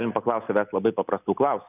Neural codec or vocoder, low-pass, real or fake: none; 3.6 kHz; real